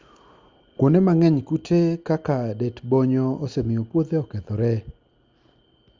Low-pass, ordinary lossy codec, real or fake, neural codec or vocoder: 7.2 kHz; Opus, 32 kbps; real; none